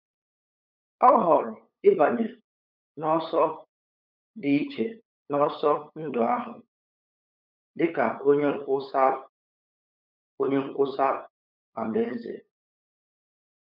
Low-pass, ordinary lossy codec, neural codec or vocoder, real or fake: 5.4 kHz; none; codec, 16 kHz, 8 kbps, FunCodec, trained on LibriTTS, 25 frames a second; fake